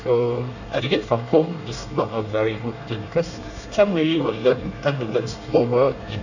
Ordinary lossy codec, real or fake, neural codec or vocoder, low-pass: none; fake; codec, 24 kHz, 1 kbps, SNAC; 7.2 kHz